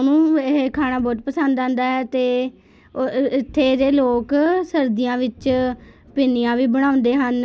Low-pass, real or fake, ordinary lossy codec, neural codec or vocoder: none; real; none; none